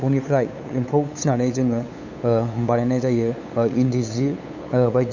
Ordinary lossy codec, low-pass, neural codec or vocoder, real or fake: none; 7.2 kHz; codec, 16 kHz, 8 kbps, FunCodec, trained on LibriTTS, 25 frames a second; fake